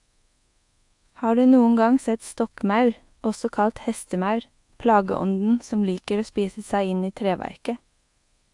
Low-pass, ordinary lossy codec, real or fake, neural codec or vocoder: 10.8 kHz; AAC, 64 kbps; fake; codec, 24 kHz, 1.2 kbps, DualCodec